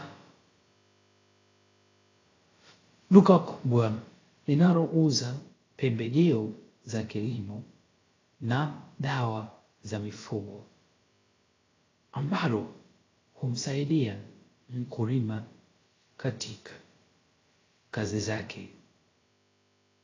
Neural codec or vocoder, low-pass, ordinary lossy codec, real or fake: codec, 16 kHz, about 1 kbps, DyCAST, with the encoder's durations; 7.2 kHz; AAC, 32 kbps; fake